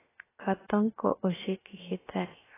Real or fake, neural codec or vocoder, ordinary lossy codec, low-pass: fake; codec, 16 kHz, 0.7 kbps, FocalCodec; AAC, 16 kbps; 3.6 kHz